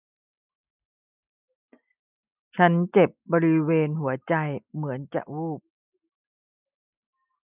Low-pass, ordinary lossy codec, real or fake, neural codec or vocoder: 3.6 kHz; none; real; none